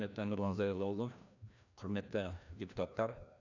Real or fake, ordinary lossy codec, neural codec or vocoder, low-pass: fake; none; codec, 16 kHz, 1 kbps, FreqCodec, larger model; 7.2 kHz